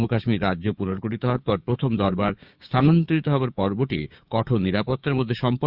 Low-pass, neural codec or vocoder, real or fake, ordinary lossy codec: 5.4 kHz; codec, 16 kHz, 6 kbps, DAC; fake; Opus, 64 kbps